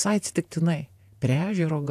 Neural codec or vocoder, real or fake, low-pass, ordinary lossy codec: vocoder, 48 kHz, 128 mel bands, Vocos; fake; 14.4 kHz; AAC, 96 kbps